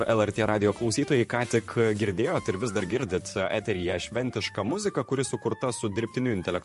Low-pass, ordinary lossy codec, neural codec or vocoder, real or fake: 14.4 kHz; MP3, 48 kbps; vocoder, 44.1 kHz, 128 mel bands, Pupu-Vocoder; fake